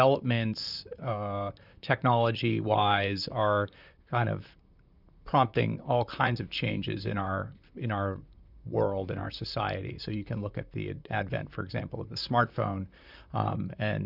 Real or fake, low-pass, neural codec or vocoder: fake; 5.4 kHz; vocoder, 44.1 kHz, 128 mel bands, Pupu-Vocoder